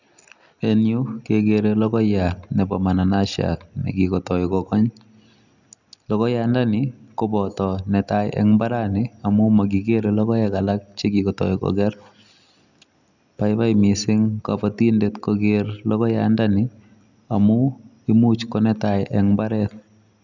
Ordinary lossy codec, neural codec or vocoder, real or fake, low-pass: none; none; real; 7.2 kHz